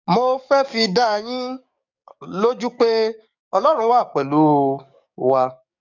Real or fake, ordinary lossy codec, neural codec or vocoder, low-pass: fake; none; codec, 44.1 kHz, 7.8 kbps, DAC; 7.2 kHz